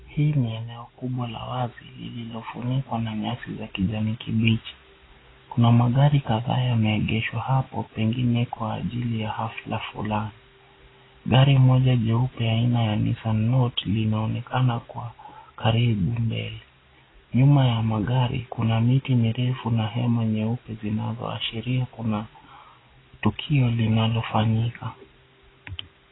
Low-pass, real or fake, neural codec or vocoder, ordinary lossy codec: 7.2 kHz; real; none; AAC, 16 kbps